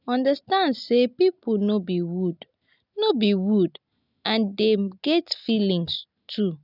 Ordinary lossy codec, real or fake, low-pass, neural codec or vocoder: none; real; 5.4 kHz; none